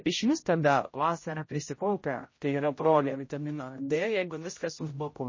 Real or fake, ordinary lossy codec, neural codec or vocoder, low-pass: fake; MP3, 32 kbps; codec, 16 kHz, 0.5 kbps, X-Codec, HuBERT features, trained on general audio; 7.2 kHz